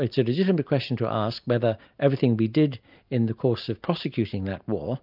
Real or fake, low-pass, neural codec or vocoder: real; 5.4 kHz; none